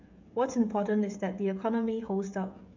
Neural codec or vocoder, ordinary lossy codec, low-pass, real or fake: codec, 16 kHz, 16 kbps, FreqCodec, smaller model; MP3, 48 kbps; 7.2 kHz; fake